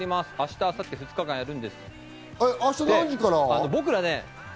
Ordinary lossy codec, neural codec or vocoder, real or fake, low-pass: none; none; real; none